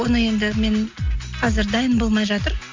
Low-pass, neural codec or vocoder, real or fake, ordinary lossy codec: 7.2 kHz; none; real; MP3, 48 kbps